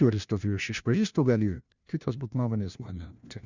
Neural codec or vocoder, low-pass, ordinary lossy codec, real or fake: codec, 16 kHz, 1 kbps, FunCodec, trained on LibriTTS, 50 frames a second; 7.2 kHz; Opus, 64 kbps; fake